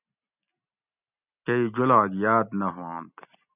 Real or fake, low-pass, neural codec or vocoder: real; 3.6 kHz; none